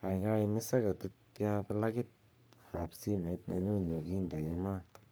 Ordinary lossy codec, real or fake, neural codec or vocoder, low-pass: none; fake; codec, 44.1 kHz, 3.4 kbps, Pupu-Codec; none